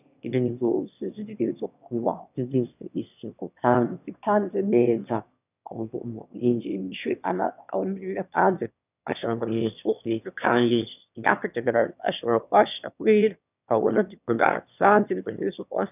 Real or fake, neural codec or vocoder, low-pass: fake; autoencoder, 22.05 kHz, a latent of 192 numbers a frame, VITS, trained on one speaker; 3.6 kHz